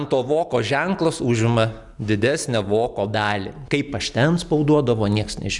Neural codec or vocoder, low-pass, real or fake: none; 10.8 kHz; real